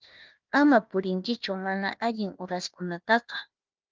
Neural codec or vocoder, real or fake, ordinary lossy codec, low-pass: codec, 16 kHz, 1 kbps, FunCodec, trained on Chinese and English, 50 frames a second; fake; Opus, 32 kbps; 7.2 kHz